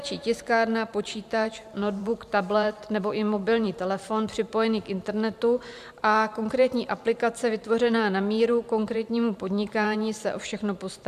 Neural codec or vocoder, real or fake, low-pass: vocoder, 44.1 kHz, 128 mel bands every 512 samples, BigVGAN v2; fake; 14.4 kHz